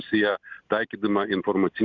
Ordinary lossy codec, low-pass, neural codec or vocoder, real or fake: Opus, 64 kbps; 7.2 kHz; none; real